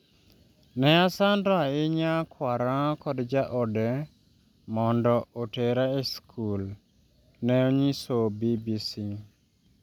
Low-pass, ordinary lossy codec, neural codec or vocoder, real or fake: 19.8 kHz; none; none; real